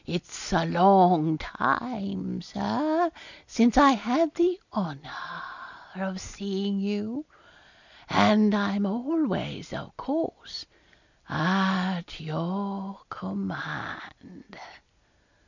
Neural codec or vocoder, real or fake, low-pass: none; real; 7.2 kHz